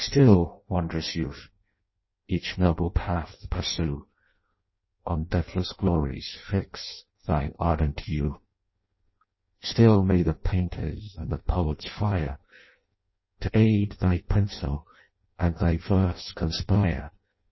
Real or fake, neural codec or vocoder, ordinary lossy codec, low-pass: fake; codec, 16 kHz in and 24 kHz out, 0.6 kbps, FireRedTTS-2 codec; MP3, 24 kbps; 7.2 kHz